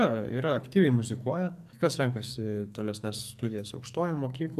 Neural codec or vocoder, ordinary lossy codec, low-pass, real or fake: codec, 44.1 kHz, 2.6 kbps, SNAC; MP3, 96 kbps; 14.4 kHz; fake